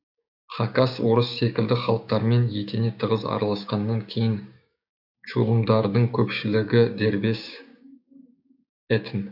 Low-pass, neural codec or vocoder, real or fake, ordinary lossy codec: 5.4 kHz; codec, 16 kHz, 6 kbps, DAC; fake; none